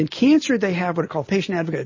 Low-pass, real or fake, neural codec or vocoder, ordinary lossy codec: 7.2 kHz; real; none; MP3, 32 kbps